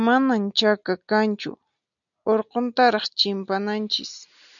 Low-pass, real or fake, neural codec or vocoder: 7.2 kHz; real; none